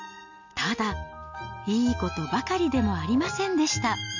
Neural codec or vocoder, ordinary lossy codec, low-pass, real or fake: none; none; 7.2 kHz; real